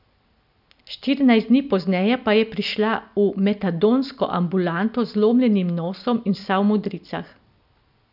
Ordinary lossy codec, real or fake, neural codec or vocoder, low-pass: none; real; none; 5.4 kHz